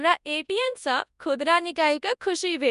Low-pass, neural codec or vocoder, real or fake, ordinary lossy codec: 10.8 kHz; codec, 24 kHz, 0.9 kbps, WavTokenizer, large speech release; fake; none